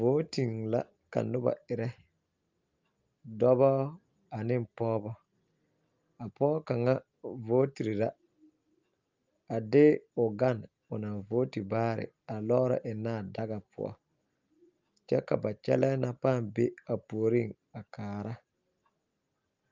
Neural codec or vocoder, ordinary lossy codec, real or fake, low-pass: none; Opus, 24 kbps; real; 7.2 kHz